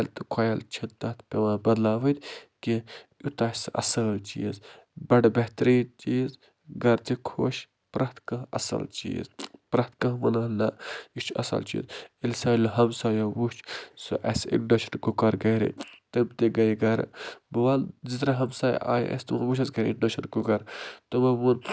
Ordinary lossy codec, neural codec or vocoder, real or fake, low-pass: none; none; real; none